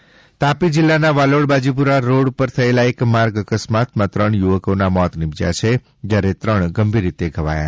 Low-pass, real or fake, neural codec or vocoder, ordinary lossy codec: none; real; none; none